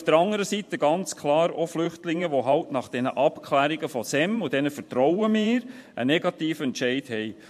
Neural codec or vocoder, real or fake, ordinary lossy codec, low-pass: vocoder, 44.1 kHz, 128 mel bands every 512 samples, BigVGAN v2; fake; MP3, 64 kbps; 14.4 kHz